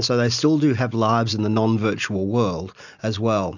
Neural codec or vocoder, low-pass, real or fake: none; 7.2 kHz; real